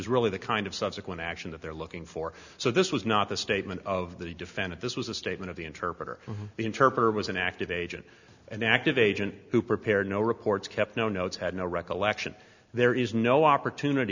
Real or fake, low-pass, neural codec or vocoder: real; 7.2 kHz; none